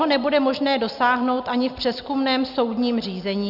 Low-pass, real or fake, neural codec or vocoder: 5.4 kHz; real; none